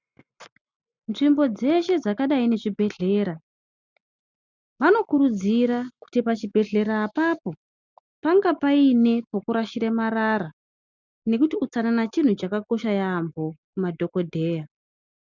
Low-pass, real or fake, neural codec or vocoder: 7.2 kHz; real; none